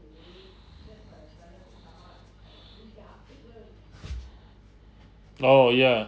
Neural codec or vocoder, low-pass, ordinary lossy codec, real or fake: none; none; none; real